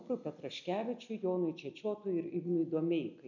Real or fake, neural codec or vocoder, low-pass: real; none; 7.2 kHz